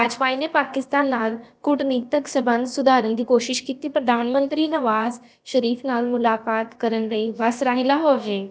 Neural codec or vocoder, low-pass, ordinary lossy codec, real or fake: codec, 16 kHz, about 1 kbps, DyCAST, with the encoder's durations; none; none; fake